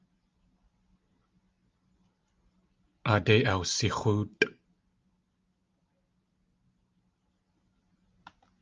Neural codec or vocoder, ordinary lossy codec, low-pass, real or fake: none; Opus, 32 kbps; 7.2 kHz; real